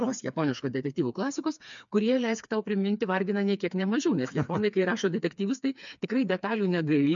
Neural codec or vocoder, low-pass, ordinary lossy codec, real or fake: codec, 16 kHz, 4 kbps, FreqCodec, smaller model; 7.2 kHz; MP3, 64 kbps; fake